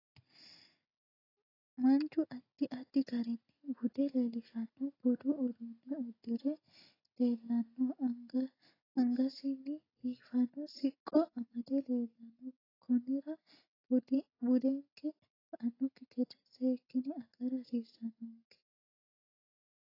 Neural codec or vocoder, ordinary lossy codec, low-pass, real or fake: none; AAC, 24 kbps; 5.4 kHz; real